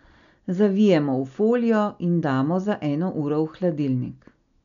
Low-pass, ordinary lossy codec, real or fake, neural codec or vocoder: 7.2 kHz; none; real; none